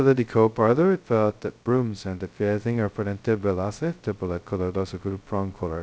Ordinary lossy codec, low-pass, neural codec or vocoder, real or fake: none; none; codec, 16 kHz, 0.2 kbps, FocalCodec; fake